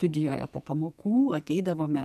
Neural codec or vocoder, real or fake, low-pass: codec, 44.1 kHz, 2.6 kbps, SNAC; fake; 14.4 kHz